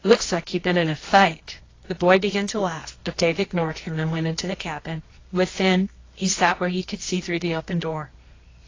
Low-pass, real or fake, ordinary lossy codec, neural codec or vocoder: 7.2 kHz; fake; AAC, 32 kbps; codec, 24 kHz, 0.9 kbps, WavTokenizer, medium music audio release